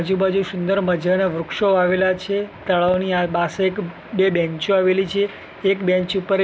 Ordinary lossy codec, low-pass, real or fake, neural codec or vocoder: none; none; real; none